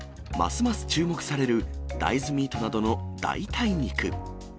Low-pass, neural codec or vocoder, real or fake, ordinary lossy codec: none; none; real; none